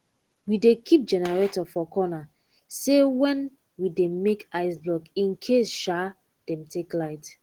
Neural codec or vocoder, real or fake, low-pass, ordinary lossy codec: none; real; 19.8 kHz; Opus, 16 kbps